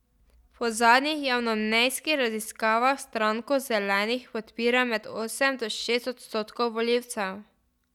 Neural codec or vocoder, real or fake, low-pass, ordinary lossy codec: none; real; 19.8 kHz; none